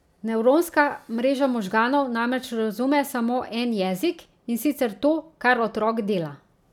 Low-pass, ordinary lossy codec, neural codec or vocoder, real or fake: 19.8 kHz; none; none; real